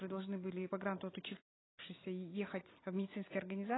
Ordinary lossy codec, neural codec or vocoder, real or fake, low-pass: AAC, 16 kbps; none; real; 7.2 kHz